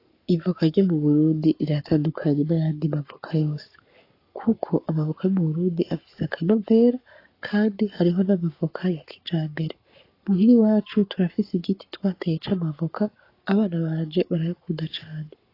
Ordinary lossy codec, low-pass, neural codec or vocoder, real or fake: AAC, 32 kbps; 5.4 kHz; codec, 44.1 kHz, 7.8 kbps, Pupu-Codec; fake